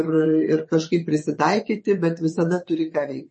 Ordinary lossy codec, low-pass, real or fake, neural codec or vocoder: MP3, 32 kbps; 9.9 kHz; fake; vocoder, 22.05 kHz, 80 mel bands, WaveNeXt